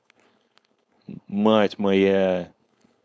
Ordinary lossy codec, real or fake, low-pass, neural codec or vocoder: none; fake; none; codec, 16 kHz, 4.8 kbps, FACodec